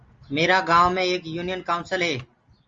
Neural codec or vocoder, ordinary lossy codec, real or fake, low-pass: none; Opus, 32 kbps; real; 7.2 kHz